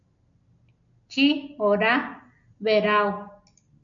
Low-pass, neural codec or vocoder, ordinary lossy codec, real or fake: 7.2 kHz; none; MP3, 64 kbps; real